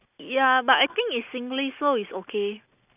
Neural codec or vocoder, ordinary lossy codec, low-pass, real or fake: none; none; 3.6 kHz; real